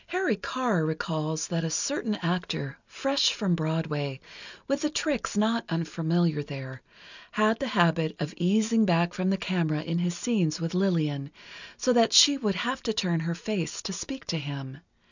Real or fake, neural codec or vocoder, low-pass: real; none; 7.2 kHz